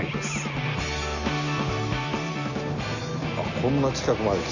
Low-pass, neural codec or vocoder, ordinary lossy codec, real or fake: 7.2 kHz; none; none; real